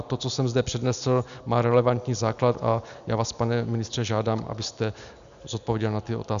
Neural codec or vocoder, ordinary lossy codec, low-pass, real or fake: none; AAC, 96 kbps; 7.2 kHz; real